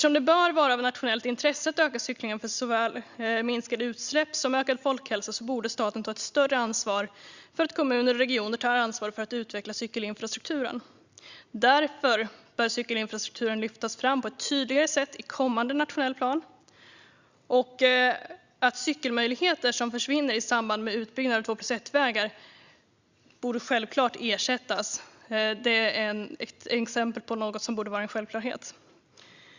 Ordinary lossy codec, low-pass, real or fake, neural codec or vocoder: Opus, 64 kbps; 7.2 kHz; real; none